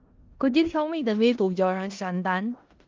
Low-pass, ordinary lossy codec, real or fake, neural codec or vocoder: 7.2 kHz; Opus, 32 kbps; fake; codec, 16 kHz in and 24 kHz out, 0.4 kbps, LongCat-Audio-Codec, four codebook decoder